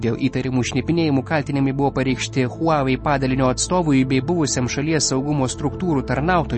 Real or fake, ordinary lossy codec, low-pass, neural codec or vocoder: real; MP3, 32 kbps; 10.8 kHz; none